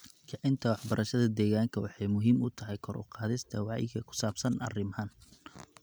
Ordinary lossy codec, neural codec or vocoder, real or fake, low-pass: none; none; real; none